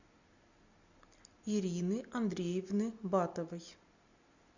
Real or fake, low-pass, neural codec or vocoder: real; 7.2 kHz; none